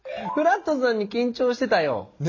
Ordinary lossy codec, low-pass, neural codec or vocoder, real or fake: MP3, 32 kbps; 7.2 kHz; none; real